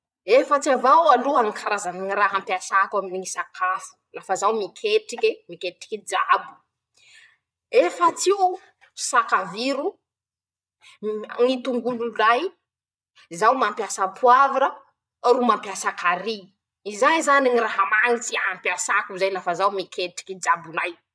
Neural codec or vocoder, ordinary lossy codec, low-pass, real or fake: vocoder, 22.05 kHz, 80 mel bands, Vocos; none; none; fake